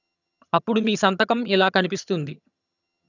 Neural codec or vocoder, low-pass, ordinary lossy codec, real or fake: vocoder, 22.05 kHz, 80 mel bands, HiFi-GAN; 7.2 kHz; none; fake